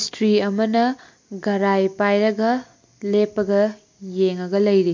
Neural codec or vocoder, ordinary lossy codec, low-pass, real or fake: none; MP3, 48 kbps; 7.2 kHz; real